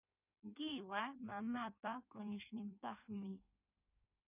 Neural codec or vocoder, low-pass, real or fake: codec, 16 kHz in and 24 kHz out, 1.1 kbps, FireRedTTS-2 codec; 3.6 kHz; fake